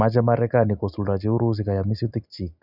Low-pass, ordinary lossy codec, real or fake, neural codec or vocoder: 5.4 kHz; none; real; none